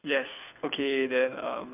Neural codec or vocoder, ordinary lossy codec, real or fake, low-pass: codec, 24 kHz, 6 kbps, HILCodec; none; fake; 3.6 kHz